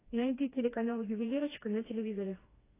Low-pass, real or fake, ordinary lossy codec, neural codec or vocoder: 3.6 kHz; fake; AAC, 16 kbps; codec, 16 kHz, 2 kbps, FreqCodec, smaller model